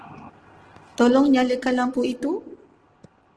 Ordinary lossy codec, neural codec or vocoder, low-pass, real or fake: Opus, 16 kbps; none; 10.8 kHz; real